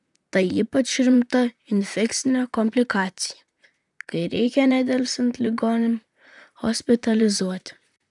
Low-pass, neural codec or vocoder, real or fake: 10.8 kHz; vocoder, 44.1 kHz, 128 mel bands, Pupu-Vocoder; fake